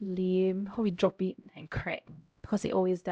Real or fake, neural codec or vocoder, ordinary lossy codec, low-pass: fake; codec, 16 kHz, 0.5 kbps, X-Codec, HuBERT features, trained on LibriSpeech; none; none